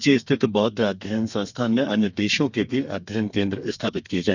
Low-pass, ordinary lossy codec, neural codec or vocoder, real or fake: 7.2 kHz; none; codec, 32 kHz, 1.9 kbps, SNAC; fake